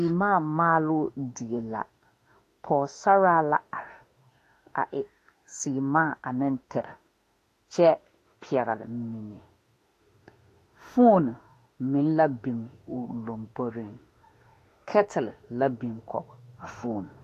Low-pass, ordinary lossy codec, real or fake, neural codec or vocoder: 14.4 kHz; AAC, 48 kbps; fake; autoencoder, 48 kHz, 32 numbers a frame, DAC-VAE, trained on Japanese speech